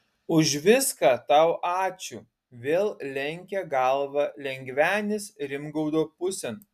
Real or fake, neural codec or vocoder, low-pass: real; none; 14.4 kHz